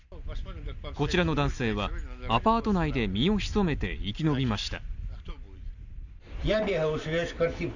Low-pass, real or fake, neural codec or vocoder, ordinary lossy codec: 7.2 kHz; real; none; none